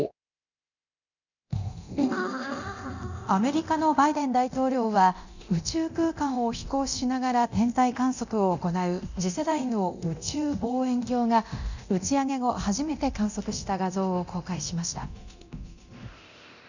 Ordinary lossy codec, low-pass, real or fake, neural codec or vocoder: none; 7.2 kHz; fake; codec, 24 kHz, 0.9 kbps, DualCodec